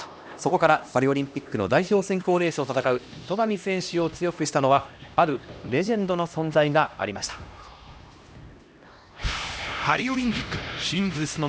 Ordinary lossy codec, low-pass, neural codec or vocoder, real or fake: none; none; codec, 16 kHz, 1 kbps, X-Codec, HuBERT features, trained on LibriSpeech; fake